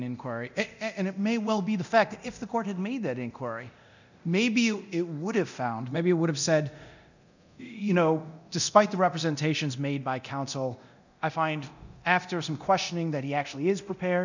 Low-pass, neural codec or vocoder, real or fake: 7.2 kHz; codec, 24 kHz, 0.9 kbps, DualCodec; fake